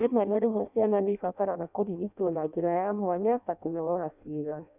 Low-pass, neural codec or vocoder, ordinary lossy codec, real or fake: 3.6 kHz; codec, 16 kHz in and 24 kHz out, 0.6 kbps, FireRedTTS-2 codec; none; fake